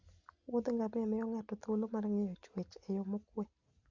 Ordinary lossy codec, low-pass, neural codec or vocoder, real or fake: Opus, 64 kbps; 7.2 kHz; none; real